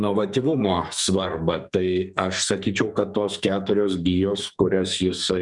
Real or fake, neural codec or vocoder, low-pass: fake; codec, 44.1 kHz, 2.6 kbps, SNAC; 10.8 kHz